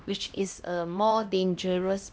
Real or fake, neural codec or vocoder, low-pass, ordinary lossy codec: fake; codec, 16 kHz, 1 kbps, X-Codec, HuBERT features, trained on LibriSpeech; none; none